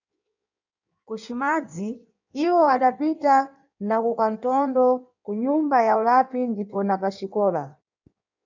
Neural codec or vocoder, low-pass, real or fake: codec, 16 kHz in and 24 kHz out, 1.1 kbps, FireRedTTS-2 codec; 7.2 kHz; fake